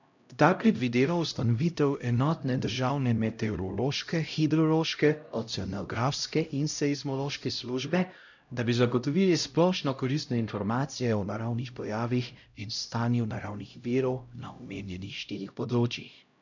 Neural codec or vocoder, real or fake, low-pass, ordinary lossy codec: codec, 16 kHz, 0.5 kbps, X-Codec, HuBERT features, trained on LibriSpeech; fake; 7.2 kHz; none